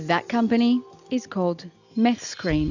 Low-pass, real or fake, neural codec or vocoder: 7.2 kHz; real; none